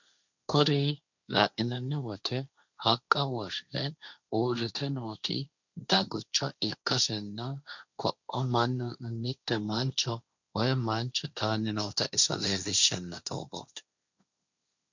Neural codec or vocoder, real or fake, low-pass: codec, 16 kHz, 1.1 kbps, Voila-Tokenizer; fake; 7.2 kHz